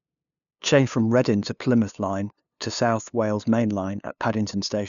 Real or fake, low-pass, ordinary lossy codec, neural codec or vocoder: fake; 7.2 kHz; none; codec, 16 kHz, 2 kbps, FunCodec, trained on LibriTTS, 25 frames a second